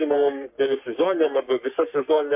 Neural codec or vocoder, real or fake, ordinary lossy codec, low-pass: codec, 44.1 kHz, 3.4 kbps, Pupu-Codec; fake; MP3, 32 kbps; 3.6 kHz